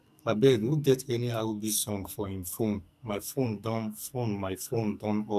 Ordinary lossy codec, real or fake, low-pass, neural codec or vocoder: none; fake; 14.4 kHz; codec, 44.1 kHz, 2.6 kbps, SNAC